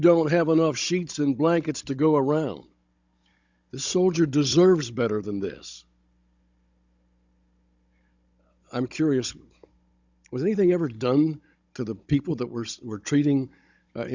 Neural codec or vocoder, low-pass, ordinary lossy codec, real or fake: codec, 16 kHz, 16 kbps, FunCodec, trained on Chinese and English, 50 frames a second; 7.2 kHz; Opus, 64 kbps; fake